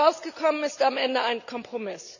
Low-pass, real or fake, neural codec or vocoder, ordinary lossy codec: 7.2 kHz; real; none; none